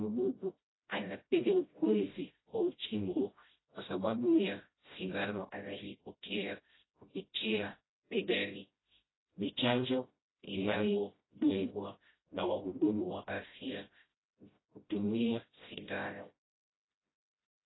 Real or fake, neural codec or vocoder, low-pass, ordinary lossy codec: fake; codec, 16 kHz, 0.5 kbps, FreqCodec, smaller model; 7.2 kHz; AAC, 16 kbps